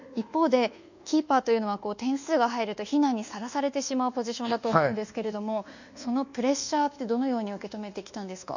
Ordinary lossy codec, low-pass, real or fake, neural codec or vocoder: none; 7.2 kHz; fake; codec, 24 kHz, 1.2 kbps, DualCodec